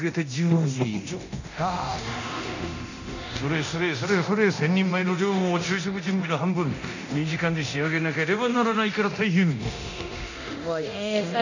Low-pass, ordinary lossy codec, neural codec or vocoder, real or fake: 7.2 kHz; none; codec, 24 kHz, 0.9 kbps, DualCodec; fake